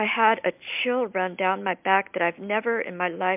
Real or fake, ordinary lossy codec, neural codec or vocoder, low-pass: real; MP3, 32 kbps; none; 3.6 kHz